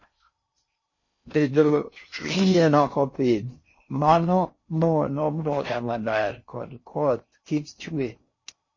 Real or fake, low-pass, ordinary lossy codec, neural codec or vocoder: fake; 7.2 kHz; MP3, 32 kbps; codec, 16 kHz in and 24 kHz out, 0.6 kbps, FocalCodec, streaming, 4096 codes